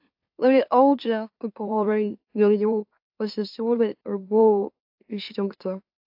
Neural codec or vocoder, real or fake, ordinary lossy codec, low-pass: autoencoder, 44.1 kHz, a latent of 192 numbers a frame, MeloTTS; fake; AAC, 48 kbps; 5.4 kHz